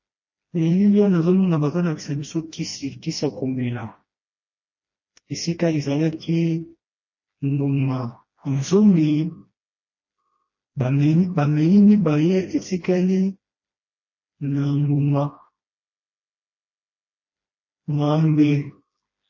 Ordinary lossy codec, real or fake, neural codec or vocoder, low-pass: MP3, 32 kbps; fake; codec, 16 kHz, 1 kbps, FreqCodec, smaller model; 7.2 kHz